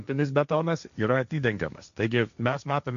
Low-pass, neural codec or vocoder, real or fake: 7.2 kHz; codec, 16 kHz, 1.1 kbps, Voila-Tokenizer; fake